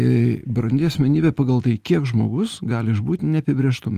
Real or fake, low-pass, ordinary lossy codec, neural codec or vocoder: real; 14.4 kHz; Opus, 24 kbps; none